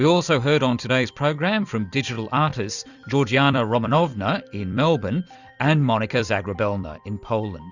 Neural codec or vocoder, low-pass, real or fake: vocoder, 44.1 kHz, 128 mel bands every 256 samples, BigVGAN v2; 7.2 kHz; fake